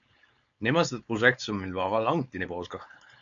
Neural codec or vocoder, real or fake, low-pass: codec, 16 kHz, 4.8 kbps, FACodec; fake; 7.2 kHz